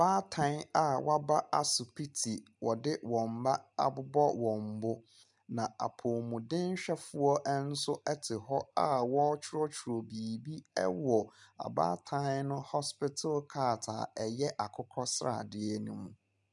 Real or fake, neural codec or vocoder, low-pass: real; none; 10.8 kHz